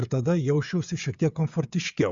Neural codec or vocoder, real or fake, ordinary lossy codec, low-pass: codec, 16 kHz, 16 kbps, FreqCodec, smaller model; fake; Opus, 64 kbps; 7.2 kHz